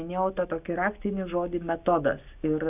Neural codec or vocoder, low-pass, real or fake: none; 3.6 kHz; real